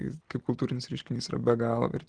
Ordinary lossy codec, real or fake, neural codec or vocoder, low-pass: Opus, 16 kbps; real; none; 9.9 kHz